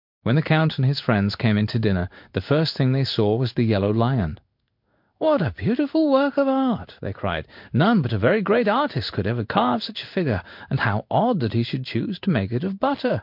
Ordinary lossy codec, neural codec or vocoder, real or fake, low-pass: MP3, 48 kbps; codec, 16 kHz in and 24 kHz out, 1 kbps, XY-Tokenizer; fake; 5.4 kHz